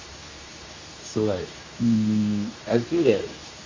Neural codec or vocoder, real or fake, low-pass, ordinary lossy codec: codec, 24 kHz, 0.9 kbps, WavTokenizer, medium speech release version 2; fake; 7.2 kHz; AAC, 32 kbps